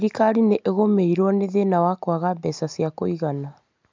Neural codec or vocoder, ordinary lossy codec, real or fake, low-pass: none; MP3, 64 kbps; real; 7.2 kHz